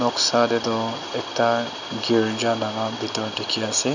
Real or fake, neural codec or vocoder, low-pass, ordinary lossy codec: real; none; 7.2 kHz; none